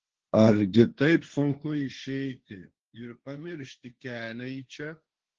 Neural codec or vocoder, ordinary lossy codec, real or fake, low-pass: codec, 16 kHz, 1.1 kbps, Voila-Tokenizer; Opus, 16 kbps; fake; 7.2 kHz